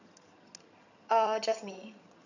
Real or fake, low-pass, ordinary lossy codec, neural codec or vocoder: fake; 7.2 kHz; none; vocoder, 22.05 kHz, 80 mel bands, HiFi-GAN